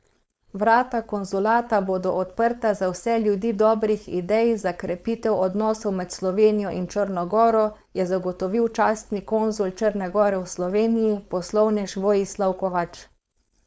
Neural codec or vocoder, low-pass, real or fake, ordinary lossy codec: codec, 16 kHz, 4.8 kbps, FACodec; none; fake; none